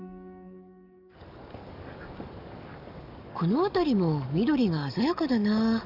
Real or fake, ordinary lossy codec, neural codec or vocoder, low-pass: fake; none; codec, 44.1 kHz, 7.8 kbps, DAC; 5.4 kHz